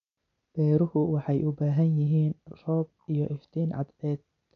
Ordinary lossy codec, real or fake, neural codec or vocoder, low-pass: none; real; none; 7.2 kHz